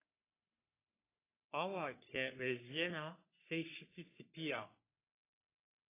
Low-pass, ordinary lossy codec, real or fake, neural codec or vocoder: 3.6 kHz; AAC, 24 kbps; fake; codec, 44.1 kHz, 3.4 kbps, Pupu-Codec